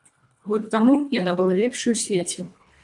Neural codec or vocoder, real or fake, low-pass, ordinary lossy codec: codec, 24 kHz, 1.5 kbps, HILCodec; fake; 10.8 kHz; MP3, 96 kbps